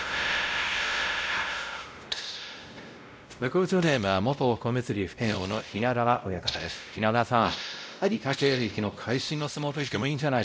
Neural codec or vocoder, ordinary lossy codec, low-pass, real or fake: codec, 16 kHz, 0.5 kbps, X-Codec, WavLM features, trained on Multilingual LibriSpeech; none; none; fake